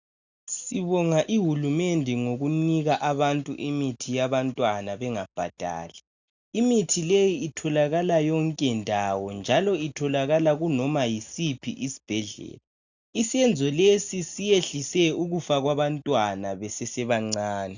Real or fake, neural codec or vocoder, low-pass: real; none; 7.2 kHz